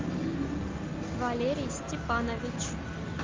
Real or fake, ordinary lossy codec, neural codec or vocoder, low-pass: real; Opus, 24 kbps; none; 7.2 kHz